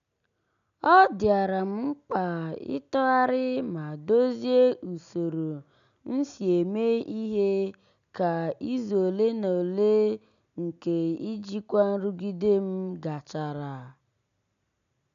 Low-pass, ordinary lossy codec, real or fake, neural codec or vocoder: 7.2 kHz; none; real; none